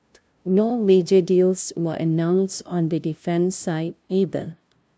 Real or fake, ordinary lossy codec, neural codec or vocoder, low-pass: fake; none; codec, 16 kHz, 0.5 kbps, FunCodec, trained on LibriTTS, 25 frames a second; none